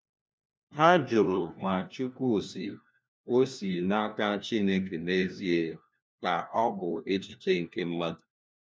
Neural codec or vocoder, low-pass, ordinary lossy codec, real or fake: codec, 16 kHz, 1 kbps, FunCodec, trained on LibriTTS, 50 frames a second; none; none; fake